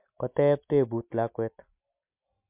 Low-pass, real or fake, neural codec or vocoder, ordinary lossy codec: 3.6 kHz; real; none; MP3, 32 kbps